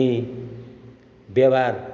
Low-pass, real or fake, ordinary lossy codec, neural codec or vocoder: none; real; none; none